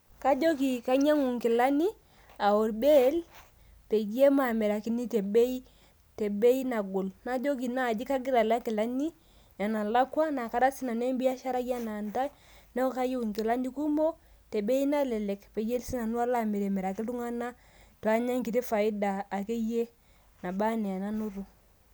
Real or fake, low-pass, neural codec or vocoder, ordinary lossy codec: real; none; none; none